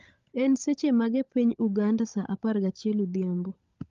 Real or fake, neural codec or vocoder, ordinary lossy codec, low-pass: fake; codec, 16 kHz, 16 kbps, FunCodec, trained on LibriTTS, 50 frames a second; Opus, 32 kbps; 7.2 kHz